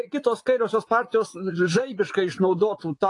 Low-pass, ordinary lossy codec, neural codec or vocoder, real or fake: 10.8 kHz; AAC, 48 kbps; vocoder, 24 kHz, 100 mel bands, Vocos; fake